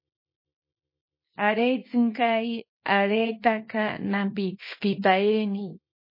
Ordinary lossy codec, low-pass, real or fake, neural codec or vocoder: MP3, 24 kbps; 5.4 kHz; fake; codec, 24 kHz, 0.9 kbps, WavTokenizer, small release